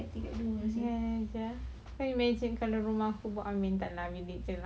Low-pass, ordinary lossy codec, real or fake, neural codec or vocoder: none; none; real; none